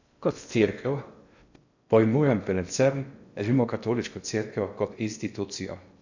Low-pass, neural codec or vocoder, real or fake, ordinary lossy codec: 7.2 kHz; codec, 16 kHz in and 24 kHz out, 0.6 kbps, FocalCodec, streaming, 2048 codes; fake; none